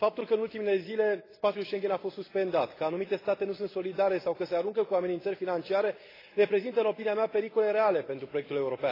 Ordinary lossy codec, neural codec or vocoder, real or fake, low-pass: AAC, 24 kbps; none; real; 5.4 kHz